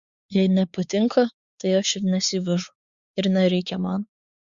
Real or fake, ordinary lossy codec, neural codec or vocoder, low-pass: fake; Opus, 64 kbps; codec, 16 kHz, 4 kbps, X-Codec, WavLM features, trained on Multilingual LibriSpeech; 7.2 kHz